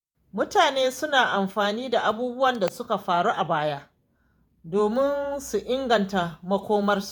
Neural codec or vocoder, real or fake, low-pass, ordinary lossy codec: vocoder, 48 kHz, 128 mel bands, Vocos; fake; none; none